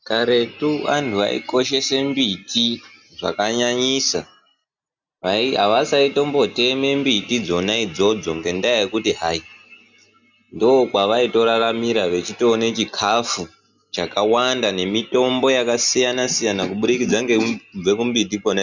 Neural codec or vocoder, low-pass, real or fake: none; 7.2 kHz; real